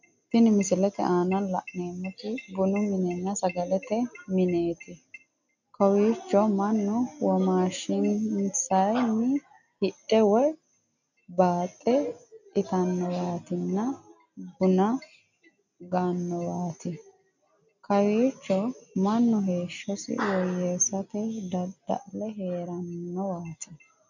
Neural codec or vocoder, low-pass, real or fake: none; 7.2 kHz; real